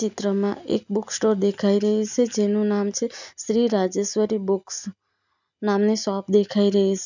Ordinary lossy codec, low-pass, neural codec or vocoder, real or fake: none; 7.2 kHz; none; real